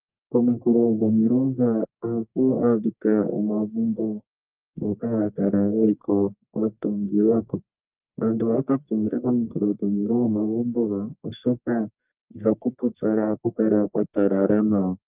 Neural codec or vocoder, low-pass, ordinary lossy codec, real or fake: codec, 44.1 kHz, 1.7 kbps, Pupu-Codec; 3.6 kHz; Opus, 24 kbps; fake